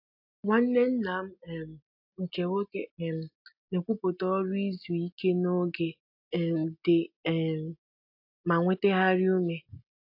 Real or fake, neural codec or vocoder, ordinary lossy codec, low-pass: real; none; AAC, 48 kbps; 5.4 kHz